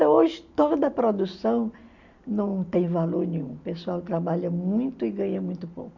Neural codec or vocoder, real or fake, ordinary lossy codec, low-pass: none; real; none; 7.2 kHz